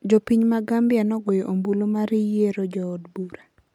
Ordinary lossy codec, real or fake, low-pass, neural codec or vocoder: MP3, 96 kbps; real; 19.8 kHz; none